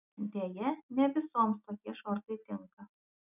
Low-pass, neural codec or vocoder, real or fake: 3.6 kHz; none; real